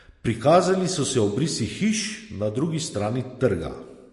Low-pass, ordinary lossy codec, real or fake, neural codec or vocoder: 14.4 kHz; MP3, 48 kbps; real; none